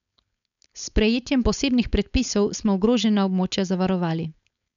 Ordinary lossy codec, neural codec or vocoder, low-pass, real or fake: none; codec, 16 kHz, 4.8 kbps, FACodec; 7.2 kHz; fake